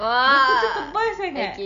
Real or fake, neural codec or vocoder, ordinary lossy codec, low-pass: real; none; none; 7.2 kHz